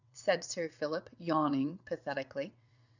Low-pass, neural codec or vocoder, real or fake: 7.2 kHz; vocoder, 22.05 kHz, 80 mel bands, WaveNeXt; fake